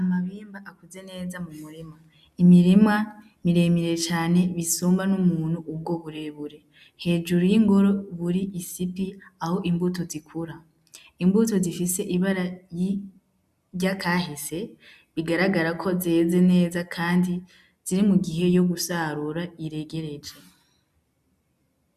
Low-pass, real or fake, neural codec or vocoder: 14.4 kHz; real; none